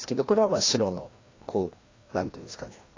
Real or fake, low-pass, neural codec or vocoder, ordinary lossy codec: fake; 7.2 kHz; codec, 16 kHz, 1 kbps, FreqCodec, larger model; AAC, 32 kbps